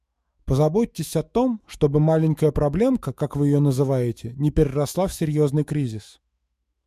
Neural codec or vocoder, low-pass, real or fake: autoencoder, 48 kHz, 128 numbers a frame, DAC-VAE, trained on Japanese speech; 14.4 kHz; fake